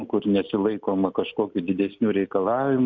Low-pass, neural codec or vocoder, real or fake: 7.2 kHz; none; real